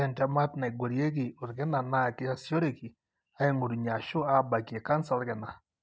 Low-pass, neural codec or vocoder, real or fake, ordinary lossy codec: none; none; real; none